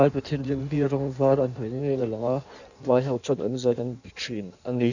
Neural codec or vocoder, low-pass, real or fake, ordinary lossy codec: codec, 16 kHz in and 24 kHz out, 1.1 kbps, FireRedTTS-2 codec; 7.2 kHz; fake; none